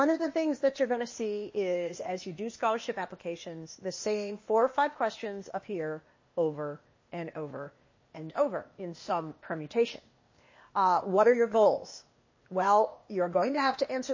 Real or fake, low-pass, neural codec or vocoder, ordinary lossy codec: fake; 7.2 kHz; codec, 16 kHz, 0.8 kbps, ZipCodec; MP3, 32 kbps